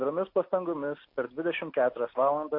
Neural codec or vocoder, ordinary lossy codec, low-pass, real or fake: none; MP3, 32 kbps; 5.4 kHz; real